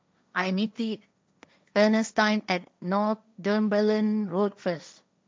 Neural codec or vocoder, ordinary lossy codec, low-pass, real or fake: codec, 16 kHz, 1.1 kbps, Voila-Tokenizer; none; none; fake